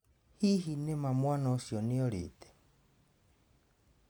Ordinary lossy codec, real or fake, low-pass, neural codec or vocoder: none; real; none; none